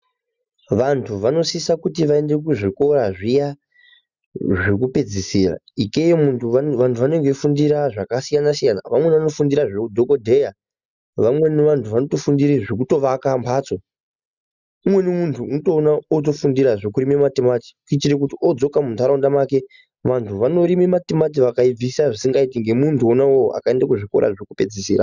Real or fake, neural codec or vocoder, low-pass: real; none; 7.2 kHz